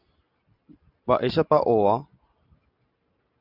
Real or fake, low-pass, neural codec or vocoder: real; 5.4 kHz; none